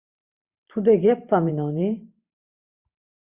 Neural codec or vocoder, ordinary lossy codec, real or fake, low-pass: vocoder, 44.1 kHz, 128 mel bands every 512 samples, BigVGAN v2; Opus, 64 kbps; fake; 3.6 kHz